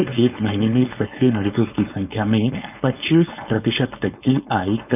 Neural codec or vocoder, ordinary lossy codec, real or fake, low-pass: codec, 16 kHz, 4.8 kbps, FACodec; none; fake; 3.6 kHz